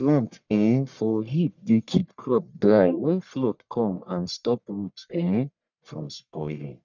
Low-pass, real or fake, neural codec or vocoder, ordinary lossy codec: 7.2 kHz; fake; codec, 44.1 kHz, 1.7 kbps, Pupu-Codec; none